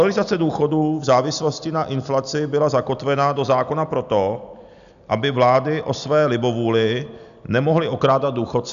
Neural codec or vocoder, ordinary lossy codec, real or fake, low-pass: none; MP3, 96 kbps; real; 7.2 kHz